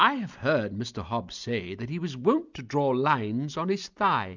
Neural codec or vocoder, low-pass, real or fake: none; 7.2 kHz; real